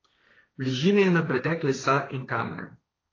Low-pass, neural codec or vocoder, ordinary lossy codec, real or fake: 7.2 kHz; codec, 16 kHz, 1.1 kbps, Voila-Tokenizer; AAC, 32 kbps; fake